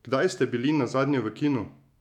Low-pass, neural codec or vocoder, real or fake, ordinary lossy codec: 19.8 kHz; autoencoder, 48 kHz, 128 numbers a frame, DAC-VAE, trained on Japanese speech; fake; none